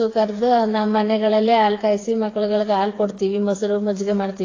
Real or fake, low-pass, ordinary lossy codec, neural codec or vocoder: fake; 7.2 kHz; AAC, 32 kbps; codec, 16 kHz, 4 kbps, FreqCodec, smaller model